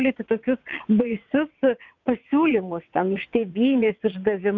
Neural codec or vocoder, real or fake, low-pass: vocoder, 22.05 kHz, 80 mel bands, WaveNeXt; fake; 7.2 kHz